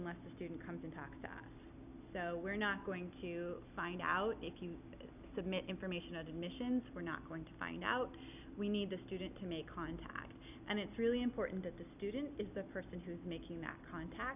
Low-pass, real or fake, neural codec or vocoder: 3.6 kHz; real; none